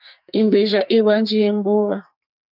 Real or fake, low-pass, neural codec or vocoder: fake; 5.4 kHz; codec, 44.1 kHz, 2.6 kbps, SNAC